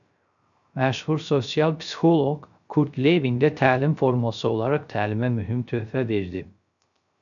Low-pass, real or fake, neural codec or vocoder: 7.2 kHz; fake; codec, 16 kHz, 0.3 kbps, FocalCodec